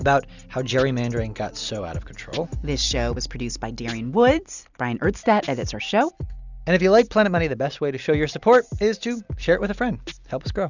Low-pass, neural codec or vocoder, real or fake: 7.2 kHz; none; real